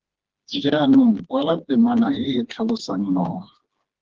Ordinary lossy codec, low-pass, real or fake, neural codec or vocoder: Opus, 16 kbps; 7.2 kHz; fake; codec, 16 kHz, 2 kbps, FreqCodec, smaller model